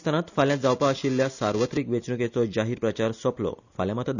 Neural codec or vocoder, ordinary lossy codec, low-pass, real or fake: none; none; 7.2 kHz; real